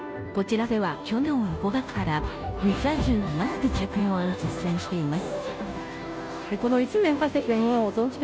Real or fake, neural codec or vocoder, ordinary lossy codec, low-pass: fake; codec, 16 kHz, 0.5 kbps, FunCodec, trained on Chinese and English, 25 frames a second; none; none